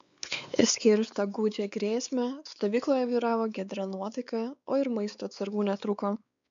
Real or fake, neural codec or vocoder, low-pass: fake; codec, 16 kHz, 4 kbps, X-Codec, WavLM features, trained on Multilingual LibriSpeech; 7.2 kHz